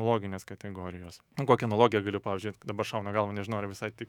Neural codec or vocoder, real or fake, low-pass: autoencoder, 48 kHz, 128 numbers a frame, DAC-VAE, trained on Japanese speech; fake; 19.8 kHz